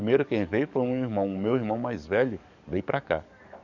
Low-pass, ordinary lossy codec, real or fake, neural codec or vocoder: 7.2 kHz; none; fake; codec, 44.1 kHz, 7.8 kbps, DAC